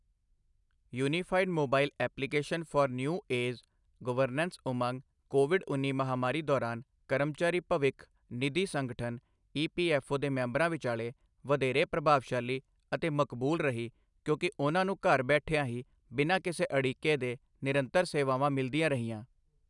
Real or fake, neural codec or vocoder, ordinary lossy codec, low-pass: real; none; none; 10.8 kHz